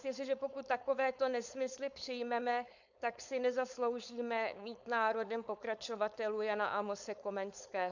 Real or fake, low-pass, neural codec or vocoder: fake; 7.2 kHz; codec, 16 kHz, 4.8 kbps, FACodec